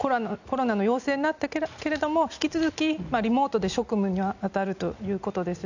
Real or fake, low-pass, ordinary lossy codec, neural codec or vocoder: real; 7.2 kHz; none; none